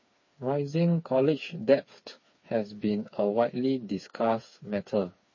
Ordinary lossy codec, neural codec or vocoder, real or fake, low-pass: MP3, 32 kbps; codec, 16 kHz, 4 kbps, FreqCodec, smaller model; fake; 7.2 kHz